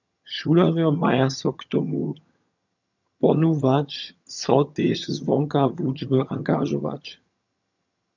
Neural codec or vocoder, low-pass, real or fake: vocoder, 22.05 kHz, 80 mel bands, HiFi-GAN; 7.2 kHz; fake